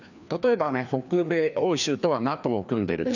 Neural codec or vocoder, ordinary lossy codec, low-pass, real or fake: codec, 16 kHz, 2 kbps, FreqCodec, larger model; none; 7.2 kHz; fake